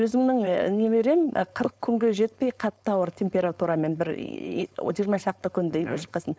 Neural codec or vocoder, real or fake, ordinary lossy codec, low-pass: codec, 16 kHz, 4.8 kbps, FACodec; fake; none; none